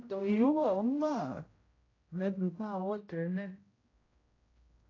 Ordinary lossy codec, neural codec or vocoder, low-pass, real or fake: AAC, 32 kbps; codec, 16 kHz, 0.5 kbps, X-Codec, HuBERT features, trained on general audio; 7.2 kHz; fake